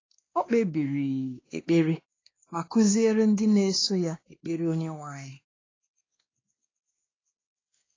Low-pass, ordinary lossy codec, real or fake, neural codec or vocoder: 7.2 kHz; AAC, 32 kbps; fake; codec, 16 kHz, 2 kbps, X-Codec, WavLM features, trained on Multilingual LibriSpeech